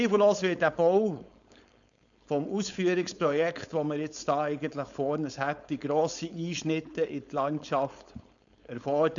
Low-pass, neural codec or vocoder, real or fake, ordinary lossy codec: 7.2 kHz; codec, 16 kHz, 4.8 kbps, FACodec; fake; none